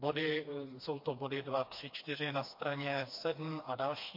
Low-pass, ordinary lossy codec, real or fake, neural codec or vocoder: 5.4 kHz; MP3, 32 kbps; fake; codec, 16 kHz, 2 kbps, FreqCodec, smaller model